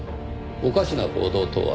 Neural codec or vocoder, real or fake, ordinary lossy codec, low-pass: none; real; none; none